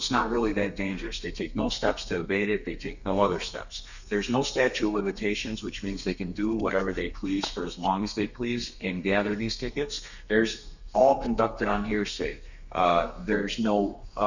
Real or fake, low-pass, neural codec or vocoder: fake; 7.2 kHz; codec, 32 kHz, 1.9 kbps, SNAC